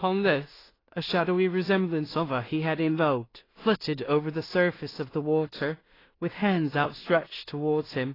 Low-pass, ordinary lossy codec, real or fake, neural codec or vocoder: 5.4 kHz; AAC, 24 kbps; fake; codec, 16 kHz in and 24 kHz out, 0.4 kbps, LongCat-Audio-Codec, two codebook decoder